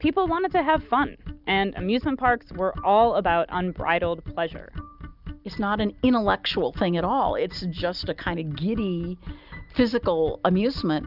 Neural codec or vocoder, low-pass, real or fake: none; 5.4 kHz; real